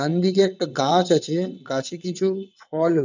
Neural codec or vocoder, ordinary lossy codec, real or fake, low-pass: vocoder, 22.05 kHz, 80 mel bands, WaveNeXt; none; fake; 7.2 kHz